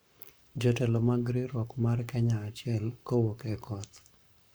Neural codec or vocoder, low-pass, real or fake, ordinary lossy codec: codec, 44.1 kHz, 7.8 kbps, Pupu-Codec; none; fake; none